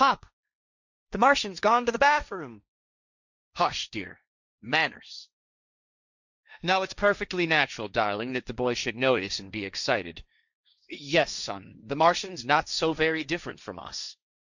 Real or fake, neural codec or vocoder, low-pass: fake; codec, 16 kHz, 1.1 kbps, Voila-Tokenizer; 7.2 kHz